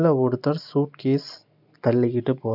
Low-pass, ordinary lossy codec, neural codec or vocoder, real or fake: 5.4 kHz; none; none; real